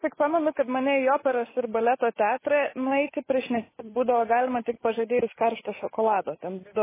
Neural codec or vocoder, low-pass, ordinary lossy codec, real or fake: none; 3.6 kHz; MP3, 16 kbps; real